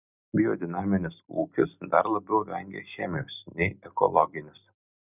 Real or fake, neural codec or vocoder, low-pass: real; none; 3.6 kHz